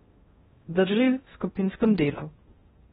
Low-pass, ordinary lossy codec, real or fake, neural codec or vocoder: 10.8 kHz; AAC, 16 kbps; fake; codec, 16 kHz in and 24 kHz out, 0.6 kbps, FocalCodec, streaming, 2048 codes